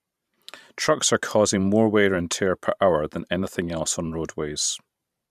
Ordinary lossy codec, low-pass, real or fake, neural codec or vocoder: none; 14.4 kHz; fake; vocoder, 44.1 kHz, 128 mel bands every 512 samples, BigVGAN v2